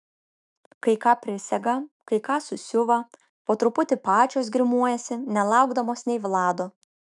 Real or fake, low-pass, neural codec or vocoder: fake; 10.8 kHz; autoencoder, 48 kHz, 128 numbers a frame, DAC-VAE, trained on Japanese speech